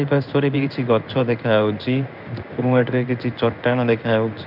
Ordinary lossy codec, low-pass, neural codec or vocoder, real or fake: none; 5.4 kHz; codec, 16 kHz in and 24 kHz out, 1 kbps, XY-Tokenizer; fake